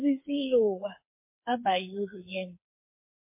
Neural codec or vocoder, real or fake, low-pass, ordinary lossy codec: codec, 16 kHz, 4 kbps, FreqCodec, smaller model; fake; 3.6 kHz; MP3, 24 kbps